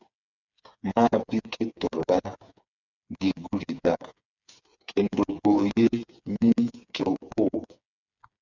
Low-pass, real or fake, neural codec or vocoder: 7.2 kHz; fake; codec, 16 kHz, 4 kbps, FreqCodec, smaller model